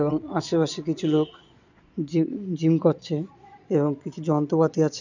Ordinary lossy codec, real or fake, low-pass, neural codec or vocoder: none; fake; 7.2 kHz; vocoder, 22.05 kHz, 80 mel bands, Vocos